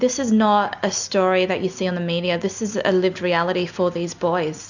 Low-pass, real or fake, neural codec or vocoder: 7.2 kHz; real; none